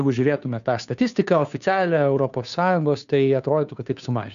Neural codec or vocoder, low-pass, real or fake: codec, 16 kHz, 2 kbps, FunCodec, trained on Chinese and English, 25 frames a second; 7.2 kHz; fake